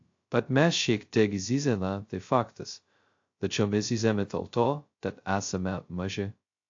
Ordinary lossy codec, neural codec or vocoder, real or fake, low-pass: AAC, 48 kbps; codec, 16 kHz, 0.2 kbps, FocalCodec; fake; 7.2 kHz